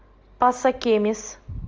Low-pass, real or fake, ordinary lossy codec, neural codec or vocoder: 7.2 kHz; real; Opus, 24 kbps; none